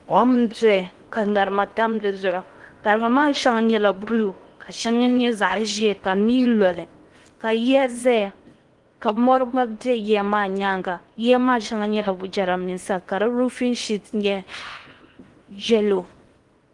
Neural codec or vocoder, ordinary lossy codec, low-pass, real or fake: codec, 16 kHz in and 24 kHz out, 0.8 kbps, FocalCodec, streaming, 65536 codes; Opus, 24 kbps; 10.8 kHz; fake